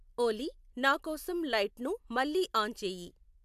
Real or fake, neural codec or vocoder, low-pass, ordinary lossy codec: real; none; 14.4 kHz; AAC, 96 kbps